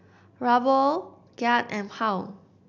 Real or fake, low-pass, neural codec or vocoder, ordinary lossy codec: real; 7.2 kHz; none; none